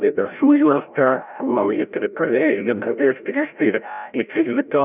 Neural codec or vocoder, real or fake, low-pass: codec, 16 kHz, 0.5 kbps, FreqCodec, larger model; fake; 3.6 kHz